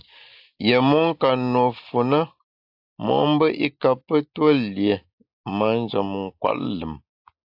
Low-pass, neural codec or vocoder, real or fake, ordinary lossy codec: 5.4 kHz; none; real; AAC, 48 kbps